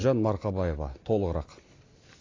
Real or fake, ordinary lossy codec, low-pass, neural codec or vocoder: real; AAC, 32 kbps; 7.2 kHz; none